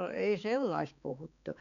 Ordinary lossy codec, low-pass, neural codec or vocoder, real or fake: none; 7.2 kHz; codec, 16 kHz, 4 kbps, X-Codec, HuBERT features, trained on balanced general audio; fake